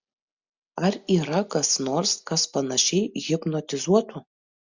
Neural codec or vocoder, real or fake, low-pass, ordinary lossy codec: none; real; 7.2 kHz; Opus, 64 kbps